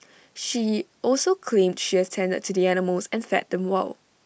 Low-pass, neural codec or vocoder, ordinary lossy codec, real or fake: none; none; none; real